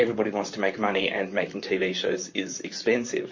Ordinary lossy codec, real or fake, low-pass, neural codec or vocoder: MP3, 32 kbps; fake; 7.2 kHz; vocoder, 44.1 kHz, 128 mel bands, Pupu-Vocoder